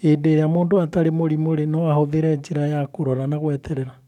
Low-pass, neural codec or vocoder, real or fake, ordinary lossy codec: 19.8 kHz; codec, 44.1 kHz, 7.8 kbps, DAC; fake; none